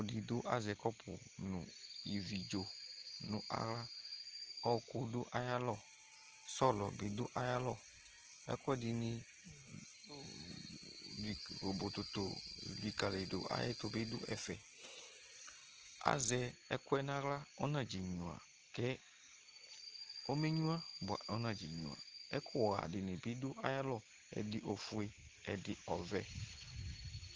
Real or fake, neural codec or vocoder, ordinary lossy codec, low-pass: real; none; Opus, 16 kbps; 7.2 kHz